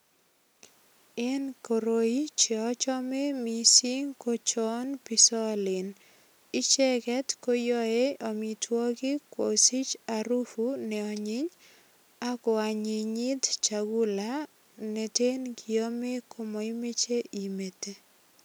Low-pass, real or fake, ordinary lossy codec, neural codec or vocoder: none; real; none; none